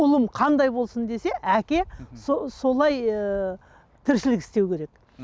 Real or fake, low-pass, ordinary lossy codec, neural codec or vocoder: real; none; none; none